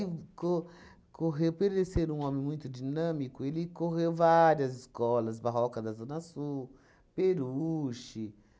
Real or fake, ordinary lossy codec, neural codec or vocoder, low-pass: real; none; none; none